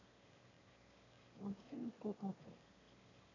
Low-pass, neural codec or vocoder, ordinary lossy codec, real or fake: 7.2 kHz; autoencoder, 22.05 kHz, a latent of 192 numbers a frame, VITS, trained on one speaker; AAC, 48 kbps; fake